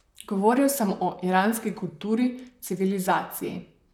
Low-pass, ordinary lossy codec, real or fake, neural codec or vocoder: 19.8 kHz; none; fake; vocoder, 44.1 kHz, 128 mel bands, Pupu-Vocoder